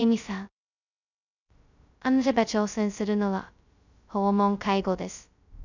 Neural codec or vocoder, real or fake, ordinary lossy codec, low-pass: codec, 16 kHz, 0.2 kbps, FocalCodec; fake; none; 7.2 kHz